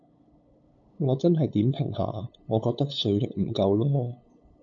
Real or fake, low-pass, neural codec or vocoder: fake; 7.2 kHz; codec, 16 kHz, 8 kbps, FunCodec, trained on LibriTTS, 25 frames a second